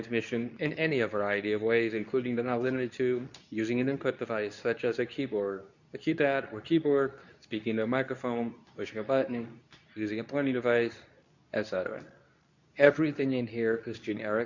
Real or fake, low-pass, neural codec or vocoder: fake; 7.2 kHz; codec, 24 kHz, 0.9 kbps, WavTokenizer, medium speech release version 2